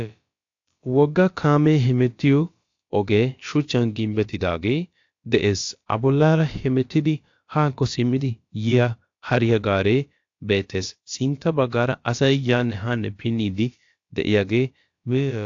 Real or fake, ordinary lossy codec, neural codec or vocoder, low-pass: fake; AAC, 48 kbps; codec, 16 kHz, about 1 kbps, DyCAST, with the encoder's durations; 7.2 kHz